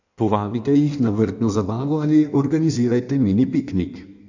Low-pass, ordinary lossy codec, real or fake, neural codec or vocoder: 7.2 kHz; none; fake; codec, 16 kHz in and 24 kHz out, 1.1 kbps, FireRedTTS-2 codec